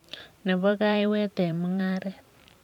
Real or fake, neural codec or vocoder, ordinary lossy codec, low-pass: fake; vocoder, 44.1 kHz, 128 mel bands every 512 samples, BigVGAN v2; none; 19.8 kHz